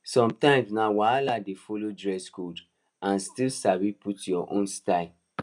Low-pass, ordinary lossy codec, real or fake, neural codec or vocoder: 10.8 kHz; none; real; none